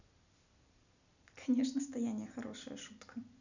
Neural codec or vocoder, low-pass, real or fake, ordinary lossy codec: none; 7.2 kHz; real; none